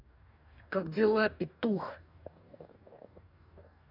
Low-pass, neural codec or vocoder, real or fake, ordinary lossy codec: 5.4 kHz; codec, 44.1 kHz, 2.6 kbps, DAC; fake; none